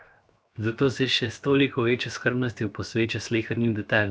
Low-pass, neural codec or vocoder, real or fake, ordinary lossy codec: none; codec, 16 kHz, 0.7 kbps, FocalCodec; fake; none